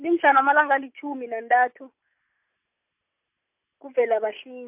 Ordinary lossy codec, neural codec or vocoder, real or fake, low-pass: MP3, 32 kbps; none; real; 3.6 kHz